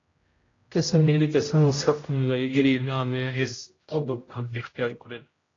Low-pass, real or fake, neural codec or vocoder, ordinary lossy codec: 7.2 kHz; fake; codec, 16 kHz, 0.5 kbps, X-Codec, HuBERT features, trained on general audio; AAC, 32 kbps